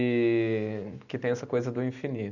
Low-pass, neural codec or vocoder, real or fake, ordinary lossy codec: 7.2 kHz; none; real; none